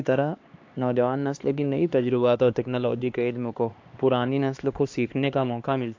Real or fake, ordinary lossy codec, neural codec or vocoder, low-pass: fake; AAC, 48 kbps; codec, 16 kHz, 2 kbps, X-Codec, HuBERT features, trained on LibriSpeech; 7.2 kHz